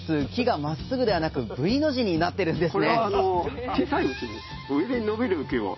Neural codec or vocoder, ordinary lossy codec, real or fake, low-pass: none; MP3, 24 kbps; real; 7.2 kHz